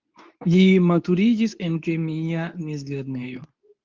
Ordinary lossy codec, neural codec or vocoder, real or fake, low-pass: Opus, 32 kbps; codec, 24 kHz, 0.9 kbps, WavTokenizer, medium speech release version 2; fake; 7.2 kHz